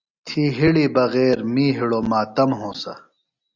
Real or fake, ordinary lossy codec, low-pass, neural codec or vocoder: real; Opus, 64 kbps; 7.2 kHz; none